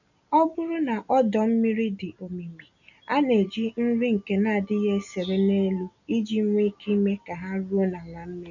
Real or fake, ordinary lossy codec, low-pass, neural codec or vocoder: real; none; 7.2 kHz; none